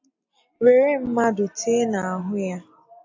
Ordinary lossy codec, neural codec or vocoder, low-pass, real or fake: AAC, 48 kbps; none; 7.2 kHz; real